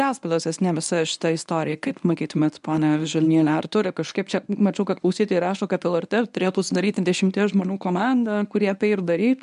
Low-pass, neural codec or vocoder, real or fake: 10.8 kHz; codec, 24 kHz, 0.9 kbps, WavTokenizer, medium speech release version 1; fake